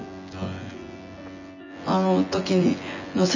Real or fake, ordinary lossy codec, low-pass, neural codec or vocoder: fake; none; 7.2 kHz; vocoder, 24 kHz, 100 mel bands, Vocos